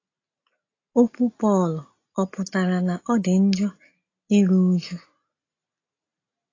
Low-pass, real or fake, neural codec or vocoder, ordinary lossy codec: 7.2 kHz; real; none; AAC, 32 kbps